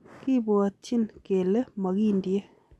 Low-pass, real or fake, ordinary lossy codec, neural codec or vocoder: none; real; none; none